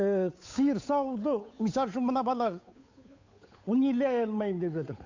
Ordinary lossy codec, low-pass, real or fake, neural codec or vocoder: AAC, 48 kbps; 7.2 kHz; fake; codec, 16 kHz, 8 kbps, FunCodec, trained on Chinese and English, 25 frames a second